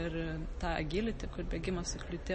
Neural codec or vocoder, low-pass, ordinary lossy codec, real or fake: none; 10.8 kHz; MP3, 32 kbps; real